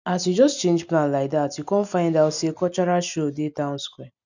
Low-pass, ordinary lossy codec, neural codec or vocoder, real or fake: 7.2 kHz; none; none; real